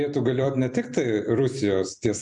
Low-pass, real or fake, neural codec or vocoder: 10.8 kHz; fake; vocoder, 44.1 kHz, 128 mel bands every 256 samples, BigVGAN v2